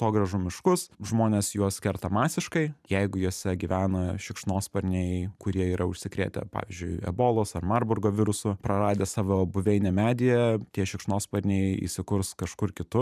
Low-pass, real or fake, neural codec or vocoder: 14.4 kHz; fake; vocoder, 44.1 kHz, 128 mel bands every 512 samples, BigVGAN v2